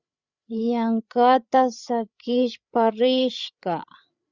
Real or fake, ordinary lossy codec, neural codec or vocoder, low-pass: fake; Opus, 64 kbps; codec, 16 kHz, 8 kbps, FreqCodec, larger model; 7.2 kHz